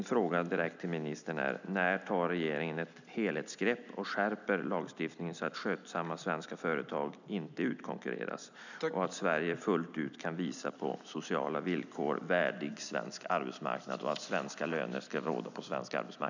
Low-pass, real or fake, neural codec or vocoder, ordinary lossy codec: 7.2 kHz; real; none; none